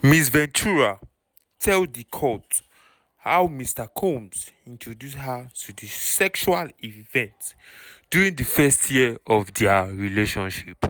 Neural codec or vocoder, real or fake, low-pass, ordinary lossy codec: none; real; none; none